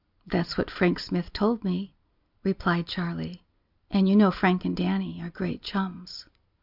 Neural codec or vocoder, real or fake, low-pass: none; real; 5.4 kHz